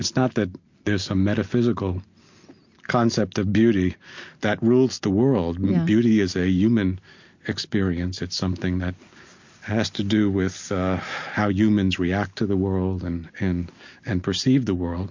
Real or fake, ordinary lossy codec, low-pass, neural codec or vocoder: real; MP3, 48 kbps; 7.2 kHz; none